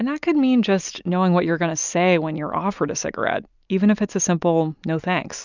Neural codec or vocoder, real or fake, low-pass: none; real; 7.2 kHz